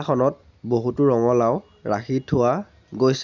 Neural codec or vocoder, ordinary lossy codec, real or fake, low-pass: none; none; real; 7.2 kHz